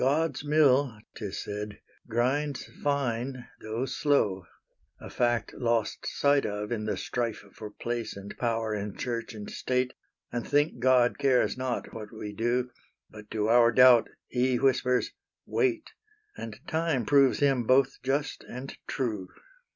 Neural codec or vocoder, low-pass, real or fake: none; 7.2 kHz; real